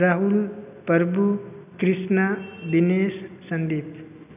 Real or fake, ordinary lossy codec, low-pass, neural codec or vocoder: real; none; 3.6 kHz; none